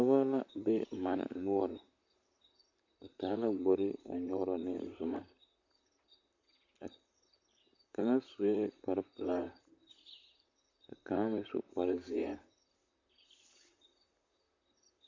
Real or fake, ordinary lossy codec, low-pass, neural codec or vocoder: fake; MP3, 48 kbps; 7.2 kHz; vocoder, 44.1 kHz, 128 mel bands, Pupu-Vocoder